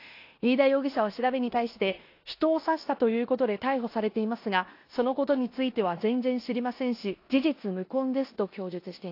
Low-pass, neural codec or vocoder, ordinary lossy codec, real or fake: 5.4 kHz; codec, 16 kHz in and 24 kHz out, 0.9 kbps, LongCat-Audio-Codec, four codebook decoder; AAC, 32 kbps; fake